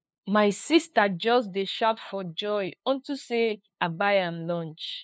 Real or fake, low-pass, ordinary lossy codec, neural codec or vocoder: fake; none; none; codec, 16 kHz, 2 kbps, FunCodec, trained on LibriTTS, 25 frames a second